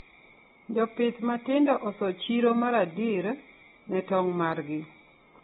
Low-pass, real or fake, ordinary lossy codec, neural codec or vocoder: 19.8 kHz; real; AAC, 16 kbps; none